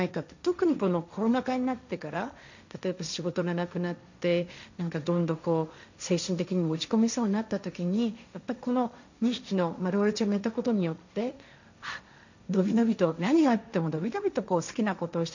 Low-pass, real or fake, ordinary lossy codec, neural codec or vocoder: 7.2 kHz; fake; none; codec, 16 kHz, 1.1 kbps, Voila-Tokenizer